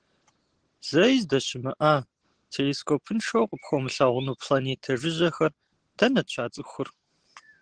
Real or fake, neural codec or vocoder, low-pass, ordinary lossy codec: real; none; 9.9 kHz; Opus, 16 kbps